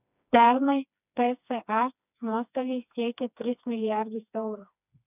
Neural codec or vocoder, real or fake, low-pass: codec, 16 kHz, 2 kbps, FreqCodec, smaller model; fake; 3.6 kHz